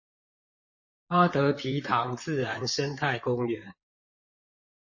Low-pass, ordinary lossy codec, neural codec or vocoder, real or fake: 7.2 kHz; MP3, 32 kbps; vocoder, 44.1 kHz, 128 mel bands, Pupu-Vocoder; fake